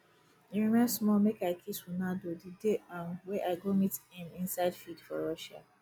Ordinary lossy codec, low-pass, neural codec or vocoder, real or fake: none; none; none; real